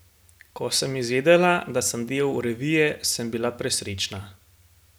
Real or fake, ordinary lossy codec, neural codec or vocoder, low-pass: real; none; none; none